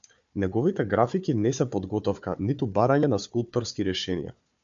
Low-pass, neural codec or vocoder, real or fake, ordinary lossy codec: 7.2 kHz; codec, 16 kHz, 4 kbps, FunCodec, trained on Chinese and English, 50 frames a second; fake; MP3, 64 kbps